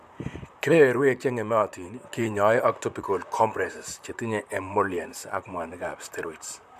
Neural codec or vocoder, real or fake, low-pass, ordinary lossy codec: vocoder, 44.1 kHz, 128 mel bands, Pupu-Vocoder; fake; 14.4 kHz; MP3, 96 kbps